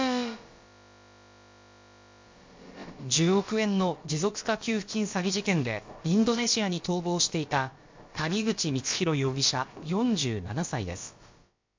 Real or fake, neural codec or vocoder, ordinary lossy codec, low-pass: fake; codec, 16 kHz, about 1 kbps, DyCAST, with the encoder's durations; MP3, 48 kbps; 7.2 kHz